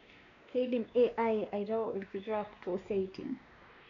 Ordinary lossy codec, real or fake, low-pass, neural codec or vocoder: none; fake; 7.2 kHz; codec, 16 kHz, 2 kbps, X-Codec, WavLM features, trained on Multilingual LibriSpeech